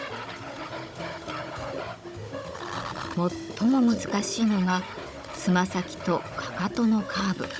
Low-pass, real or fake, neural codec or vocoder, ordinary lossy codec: none; fake; codec, 16 kHz, 16 kbps, FunCodec, trained on Chinese and English, 50 frames a second; none